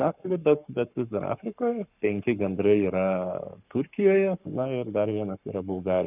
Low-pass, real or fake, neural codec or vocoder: 3.6 kHz; fake; codec, 44.1 kHz, 7.8 kbps, Pupu-Codec